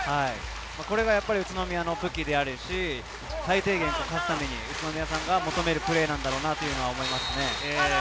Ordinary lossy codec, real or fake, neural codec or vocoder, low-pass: none; real; none; none